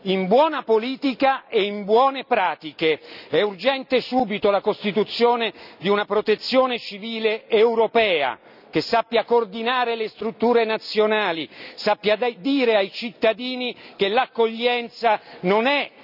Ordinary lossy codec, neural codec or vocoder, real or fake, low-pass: none; none; real; 5.4 kHz